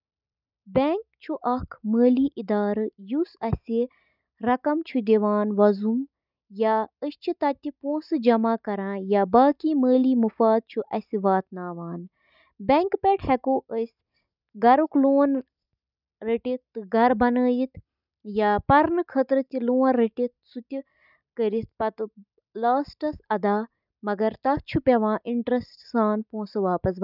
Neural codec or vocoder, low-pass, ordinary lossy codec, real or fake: none; 5.4 kHz; none; real